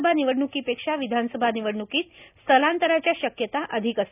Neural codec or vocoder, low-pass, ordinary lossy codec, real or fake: none; 3.6 kHz; none; real